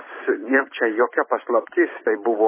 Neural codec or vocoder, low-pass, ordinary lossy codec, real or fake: vocoder, 44.1 kHz, 128 mel bands every 256 samples, BigVGAN v2; 3.6 kHz; MP3, 16 kbps; fake